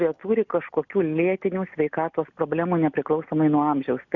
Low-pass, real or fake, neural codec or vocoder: 7.2 kHz; real; none